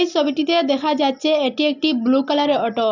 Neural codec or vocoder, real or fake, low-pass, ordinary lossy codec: none; real; 7.2 kHz; none